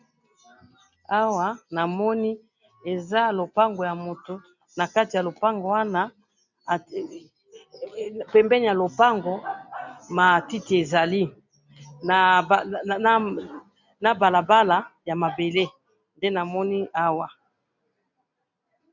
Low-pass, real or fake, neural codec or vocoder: 7.2 kHz; real; none